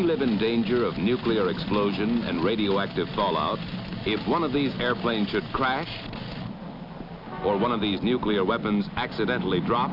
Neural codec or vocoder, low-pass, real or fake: none; 5.4 kHz; real